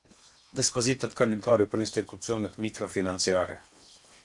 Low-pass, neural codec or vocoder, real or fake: 10.8 kHz; codec, 16 kHz in and 24 kHz out, 0.8 kbps, FocalCodec, streaming, 65536 codes; fake